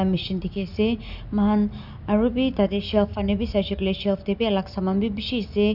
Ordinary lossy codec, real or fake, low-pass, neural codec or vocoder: none; real; 5.4 kHz; none